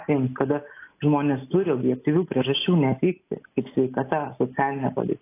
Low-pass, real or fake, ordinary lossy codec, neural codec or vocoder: 3.6 kHz; real; AAC, 24 kbps; none